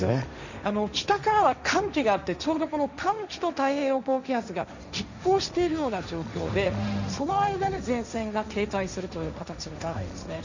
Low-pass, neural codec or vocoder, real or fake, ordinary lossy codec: 7.2 kHz; codec, 16 kHz, 1.1 kbps, Voila-Tokenizer; fake; none